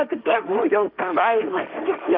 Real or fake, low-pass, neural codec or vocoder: fake; 5.4 kHz; codec, 16 kHz, 1.1 kbps, Voila-Tokenizer